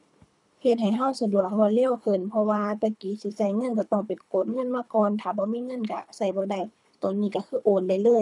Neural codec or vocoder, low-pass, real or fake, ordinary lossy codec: codec, 24 kHz, 3 kbps, HILCodec; 10.8 kHz; fake; none